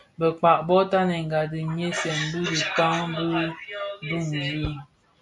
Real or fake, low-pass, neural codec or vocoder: real; 10.8 kHz; none